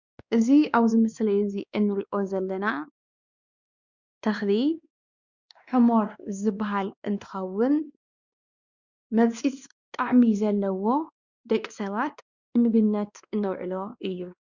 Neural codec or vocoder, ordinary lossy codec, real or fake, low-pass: codec, 16 kHz, 2 kbps, X-Codec, WavLM features, trained on Multilingual LibriSpeech; Opus, 64 kbps; fake; 7.2 kHz